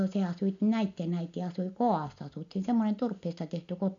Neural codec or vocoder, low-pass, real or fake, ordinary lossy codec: none; 7.2 kHz; real; none